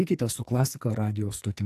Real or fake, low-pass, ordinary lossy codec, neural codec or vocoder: fake; 14.4 kHz; AAC, 64 kbps; codec, 44.1 kHz, 2.6 kbps, SNAC